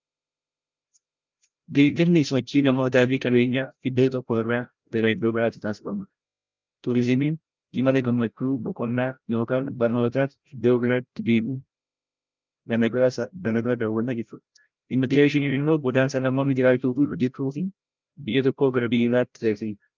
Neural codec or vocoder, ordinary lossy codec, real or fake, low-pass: codec, 16 kHz, 0.5 kbps, FreqCodec, larger model; Opus, 24 kbps; fake; 7.2 kHz